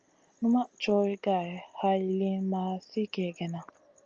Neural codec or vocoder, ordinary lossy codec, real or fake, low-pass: none; Opus, 24 kbps; real; 7.2 kHz